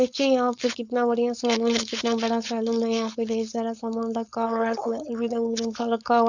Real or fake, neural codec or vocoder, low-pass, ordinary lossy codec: fake; codec, 16 kHz, 4.8 kbps, FACodec; 7.2 kHz; none